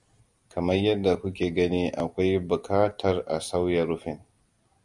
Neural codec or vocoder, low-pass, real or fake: none; 10.8 kHz; real